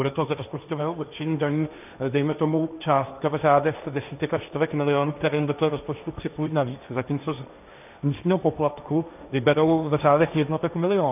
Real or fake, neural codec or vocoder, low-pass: fake; codec, 16 kHz, 1.1 kbps, Voila-Tokenizer; 3.6 kHz